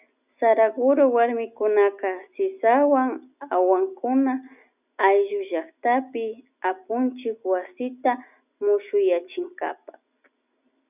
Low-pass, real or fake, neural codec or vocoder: 3.6 kHz; real; none